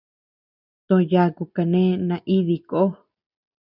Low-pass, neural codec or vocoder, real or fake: 5.4 kHz; none; real